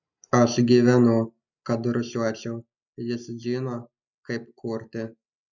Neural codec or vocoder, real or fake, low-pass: none; real; 7.2 kHz